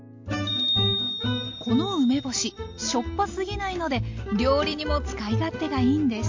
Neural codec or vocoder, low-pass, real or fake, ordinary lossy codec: none; 7.2 kHz; real; MP3, 48 kbps